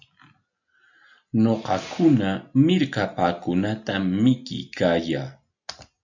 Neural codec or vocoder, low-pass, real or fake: none; 7.2 kHz; real